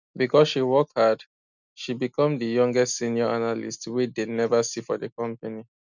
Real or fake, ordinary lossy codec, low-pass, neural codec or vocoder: real; none; 7.2 kHz; none